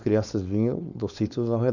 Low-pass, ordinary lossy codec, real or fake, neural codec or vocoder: 7.2 kHz; none; fake; codec, 16 kHz, 4.8 kbps, FACodec